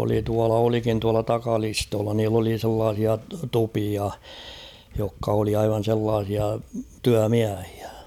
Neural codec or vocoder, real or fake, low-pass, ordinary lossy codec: none; real; 19.8 kHz; none